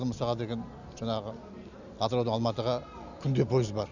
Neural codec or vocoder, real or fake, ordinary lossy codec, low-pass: none; real; none; 7.2 kHz